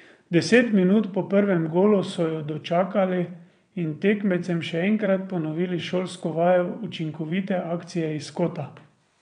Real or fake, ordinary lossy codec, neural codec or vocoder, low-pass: fake; none; vocoder, 22.05 kHz, 80 mel bands, WaveNeXt; 9.9 kHz